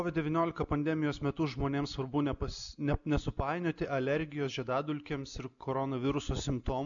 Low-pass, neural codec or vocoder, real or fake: 7.2 kHz; none; real